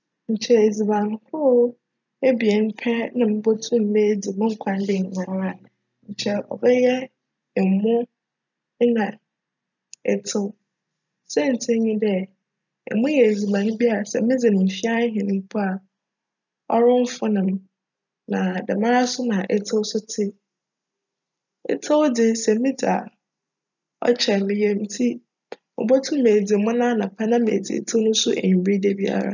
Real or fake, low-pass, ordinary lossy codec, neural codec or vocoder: real; 7.2 kHz; none; none